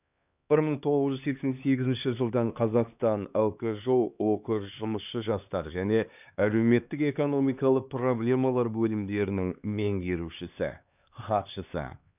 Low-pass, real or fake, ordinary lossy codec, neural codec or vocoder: 3.6 kHz; fake; none; codec, 16 kHz, 2 kbps, X-Codec, HuBERT features, trained on LibriSpeech